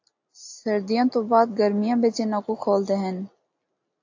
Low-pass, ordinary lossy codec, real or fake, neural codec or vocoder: 7.2 kHz; AAC, 48 kbps; real; none